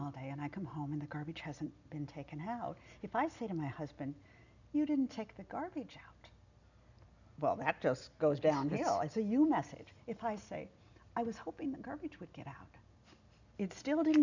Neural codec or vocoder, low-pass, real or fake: none; 7.2 kHz; real